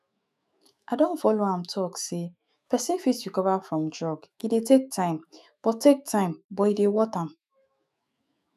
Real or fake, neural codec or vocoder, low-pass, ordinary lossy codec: fake; autoencoder, 48 kHz, 128 numbers a frame, DAC-VAE, trained on Japanese speech; 14.4 kHz; none